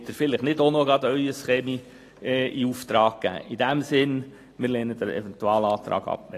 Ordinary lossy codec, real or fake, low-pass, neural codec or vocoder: AAC, 48 kbps; real; 14.4 kHz; none